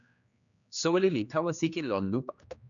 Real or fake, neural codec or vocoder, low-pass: fake; codec, 16 kHz, 2 kbps, X-Codec, HuBERT features, trained on general audio; 7.2 kHz